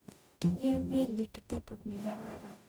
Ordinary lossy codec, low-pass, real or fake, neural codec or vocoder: none; none; fake; codec, 44.1 kHz, 0.9 kbps, DAC